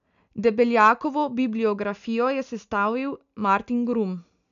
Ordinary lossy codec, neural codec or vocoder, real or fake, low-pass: none; none; real; 7.2 kHz